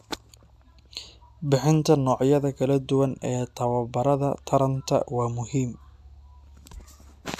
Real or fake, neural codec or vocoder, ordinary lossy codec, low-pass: real; none; AAC, 96 kbps; 14.4 kHz